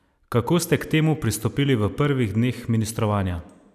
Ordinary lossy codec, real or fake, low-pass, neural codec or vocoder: none; real; 14.4 kHz; none